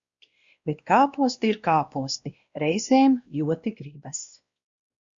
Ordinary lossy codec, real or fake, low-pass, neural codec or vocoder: Opus, 64 kbps; fake; 7.2 kHz; codec, 16 kHz, 1 kbps, X-Codec, WavLM features, trained on Multilingual LibriSpeech